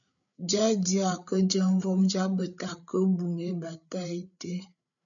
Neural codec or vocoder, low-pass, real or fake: codec, 16 kHz, 8 kbps, FreqCodec, larger model; 7.2 kHz; fake